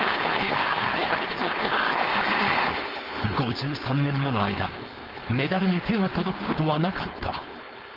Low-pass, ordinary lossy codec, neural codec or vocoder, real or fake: 5.4 kHz; Opus, 24 kbps; codec, 16 kHz, 4.8 kbps, FACodec; fake